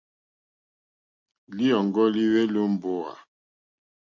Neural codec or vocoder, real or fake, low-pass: none; real; 7.2 kHz